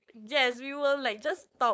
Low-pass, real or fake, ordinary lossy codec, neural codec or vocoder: none; fake; none; codec, 16 kHz, 4.8 kbps, FACodec